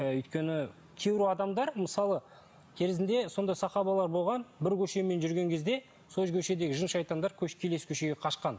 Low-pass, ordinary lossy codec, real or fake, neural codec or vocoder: none; none; real; none